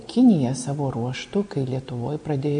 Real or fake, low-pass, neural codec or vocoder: real; 9.9 kHz; none